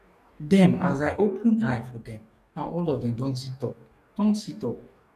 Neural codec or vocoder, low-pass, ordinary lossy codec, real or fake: codec, 44.1 kHz, 2.6 kbps, DAC; 14.4 kHz; none; fake